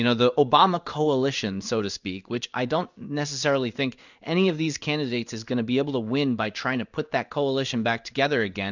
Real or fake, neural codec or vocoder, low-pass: fake; codec, 16 kHz in and 24 kHz out, 1 kbps, XY-Tokenizer; 7.2 kHz